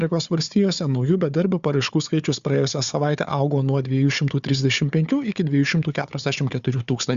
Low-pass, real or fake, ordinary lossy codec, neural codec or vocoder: 7.2 kHz; fake; Opus, 64 kbps; codec, 16 kHz, 4 kbps, FunCodec, trained on Chinese and English, 50 frames a second